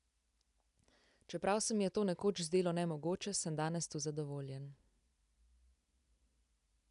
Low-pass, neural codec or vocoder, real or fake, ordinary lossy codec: 10.8 kHz; none; real; none